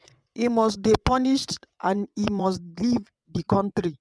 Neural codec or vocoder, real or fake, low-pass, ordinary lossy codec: vocoder, 22.05 kHz, 80 mel bands, WaveNeXt; fake; none; none